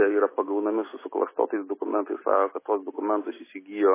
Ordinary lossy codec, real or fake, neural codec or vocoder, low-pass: MP3, 16 kbps; real; none; 3.6 kHz